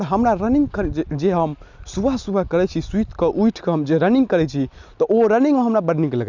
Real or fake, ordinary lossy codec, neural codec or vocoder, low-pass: real; none; none; 7.2 kHz